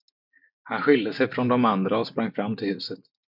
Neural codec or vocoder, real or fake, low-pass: none; real; 5.4 kHz